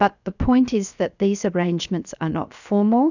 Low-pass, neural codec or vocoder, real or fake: 7.2 kHz; codec, 16 kHz, 0.7 kbps, FocalCodec; fake